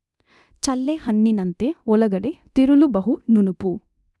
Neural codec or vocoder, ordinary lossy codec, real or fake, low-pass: codec, 24 kHz, 0.9 kbps, DualCodec; none; fake; none